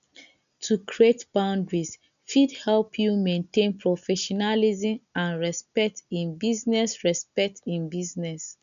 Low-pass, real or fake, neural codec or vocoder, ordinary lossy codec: 7.2 kHz; real; none; none